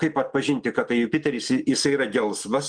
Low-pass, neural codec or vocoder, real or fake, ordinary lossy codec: 9.9 kHz; none; real; Opus, 24 kbps